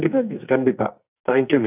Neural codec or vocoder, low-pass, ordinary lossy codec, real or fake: codec, 44.1 kHz, 2.6 kbps, SNAC; 3.6 kHz; none; fake